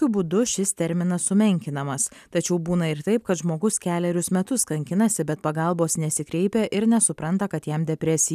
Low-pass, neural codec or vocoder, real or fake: 14.4 kHz; none; real